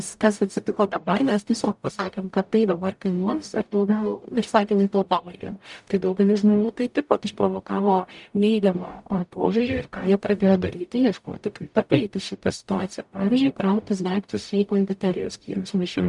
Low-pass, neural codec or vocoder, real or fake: 10.8 kHz; codec, 44.1 kHz, 0.9 kbps, DAC; fake